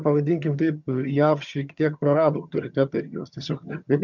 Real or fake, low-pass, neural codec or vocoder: fake; 7.2 kHz; vocoder, 22.05 kHz, 80 mel bands, HiFi-GAN